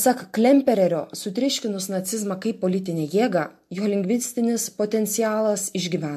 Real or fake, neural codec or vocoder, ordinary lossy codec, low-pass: real; none; MP3, 64 kbps; 14.4 kHz